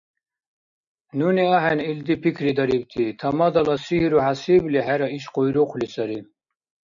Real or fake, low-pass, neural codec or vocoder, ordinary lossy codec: real; 7.2 kHz; none; MP3, 96 kbps